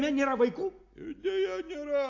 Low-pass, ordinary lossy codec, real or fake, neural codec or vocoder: 7.2 kHz; AAC, 48 kbps; real; none